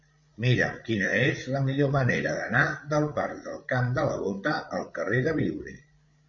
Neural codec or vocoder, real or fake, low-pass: codec, 16 kHz, 8 kbps, FreqCodec, larger model; fake; 7.2 kHz